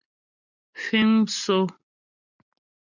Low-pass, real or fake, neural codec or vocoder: 7.2 kHz; real; none